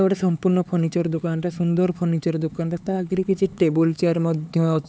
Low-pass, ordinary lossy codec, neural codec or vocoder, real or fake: none; none; codec, 16 kHz, 4 kbps, X-Codec, HuBERT features, trained on LibriSpeech; fake